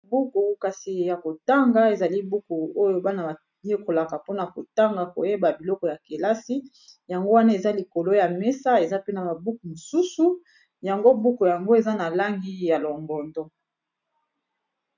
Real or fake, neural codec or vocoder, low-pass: real; none; 7.2 kHz